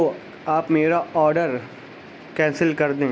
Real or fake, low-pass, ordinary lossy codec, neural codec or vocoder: real; none; none; none